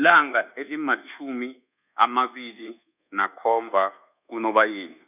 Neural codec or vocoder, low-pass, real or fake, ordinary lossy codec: codec, 24 kHz, 1.2 kbps, DualCodec; 3.6 kHz; fake; none